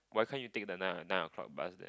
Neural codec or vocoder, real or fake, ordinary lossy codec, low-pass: none; real; none; none